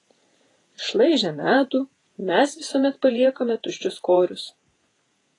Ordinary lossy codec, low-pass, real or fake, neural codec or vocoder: AAC, 32 kbps; 10.8 kHz; fake; vocoder, 48 kHz, 128 mel bands, Vocos